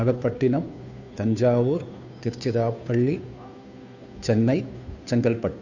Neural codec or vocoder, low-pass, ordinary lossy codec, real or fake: codec, 16 kHz, 2 kbps, FunCodec, trained on Chinese and English, 25 frames a second; 7.2 kHz; none; fake